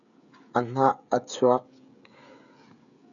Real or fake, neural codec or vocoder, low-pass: fake; codec, 16 kHz, 16 kbps, FreqCodec, smaller model; 7.2 kHz